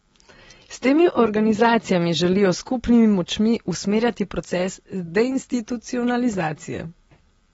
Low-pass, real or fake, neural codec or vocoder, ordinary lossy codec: 19.8 kHz; real; none; AAC, 24 kbps